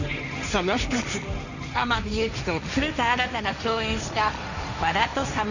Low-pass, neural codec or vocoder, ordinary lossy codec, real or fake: 7.2 kHz; codec, 16 kHz, 1.1 kbps, Voila-Tokenizer; none; fake